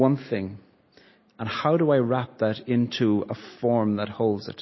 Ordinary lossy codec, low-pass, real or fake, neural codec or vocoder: MP3, 24 kbps; 7.2 kHz; real; none